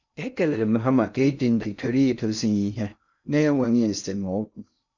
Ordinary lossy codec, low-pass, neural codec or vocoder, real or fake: none; 7.2 kHz; codec, 16 kHz in and 24 kHz out, 0.6 kbps, FocalCodec, streaming, 2048 codes; fake